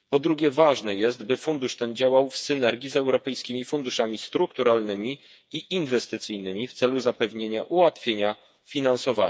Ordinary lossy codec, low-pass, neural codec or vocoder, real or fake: none; none; codec, 16 kHz, 4 kbps, FreqCodec, smaller model; fake